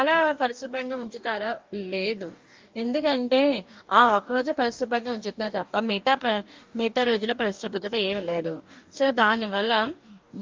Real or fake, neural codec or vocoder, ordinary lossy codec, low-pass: fake; codec, 44.1 kHz, 2.6 kbps, DAC; Opus, 24 kbps; 7.2 kHz